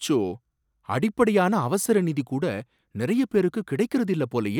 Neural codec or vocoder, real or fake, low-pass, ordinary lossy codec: none; real; 19.8 kHz; none